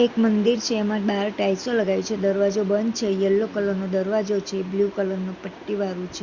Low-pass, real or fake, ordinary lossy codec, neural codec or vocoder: 7.2 kHz; real; Opus, 64 kbps; none